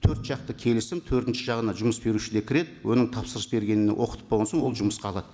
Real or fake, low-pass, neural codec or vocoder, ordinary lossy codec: real; none; none; none